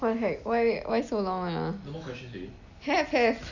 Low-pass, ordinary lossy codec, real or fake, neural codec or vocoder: 7.2 kHz; none; real; none